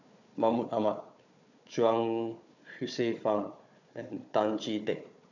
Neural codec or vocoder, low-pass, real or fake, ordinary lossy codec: codec, 16 kHz, 4 kbps, FunCodec, trained on Chinese and English, 50 frames a second; 7.2 kHz; fake; none